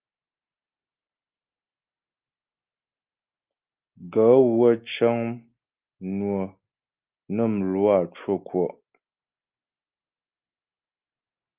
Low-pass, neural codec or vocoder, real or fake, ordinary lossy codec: 3.6 kHz; none; real; Opus, 32 kbps